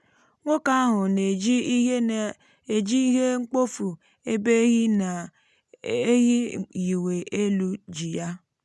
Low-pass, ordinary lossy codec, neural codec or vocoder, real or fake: none; none; none; real